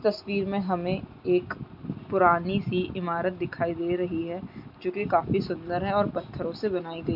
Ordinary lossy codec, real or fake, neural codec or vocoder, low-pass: none; real; none; 5.4 kHz